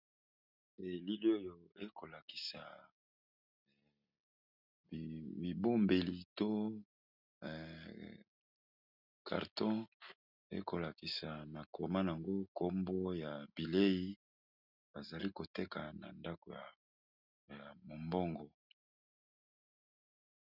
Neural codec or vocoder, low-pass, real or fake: none; 5.4 kHz; real